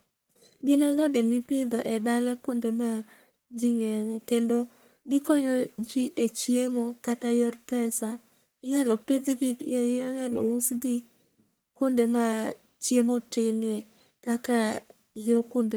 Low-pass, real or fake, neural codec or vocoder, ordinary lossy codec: none; fake; codec, 44.1 kHz, 1.7 kbps, Pupu-Codec; none